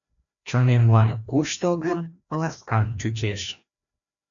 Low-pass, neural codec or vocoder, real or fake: 7.2 kHz; codec, 16 kHz, 1 kbps, FreqCodec, larger model; fake